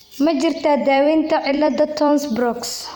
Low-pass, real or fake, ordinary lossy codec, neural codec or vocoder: none; fake; none; vocoder, 44.1 kHz, 128 mel bands every 256 samples, BigVGAN v2